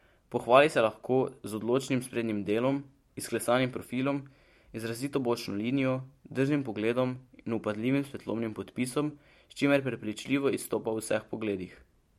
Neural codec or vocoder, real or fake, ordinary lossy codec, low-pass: vocoder, 44.1 kHz, 128 mel bands every 512 samples, BigVGAN v2; fake; MP3, 64 kbps; 19.8 kHz